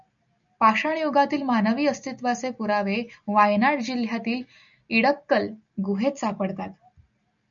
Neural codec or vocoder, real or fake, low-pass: none; real; 7.2 kHz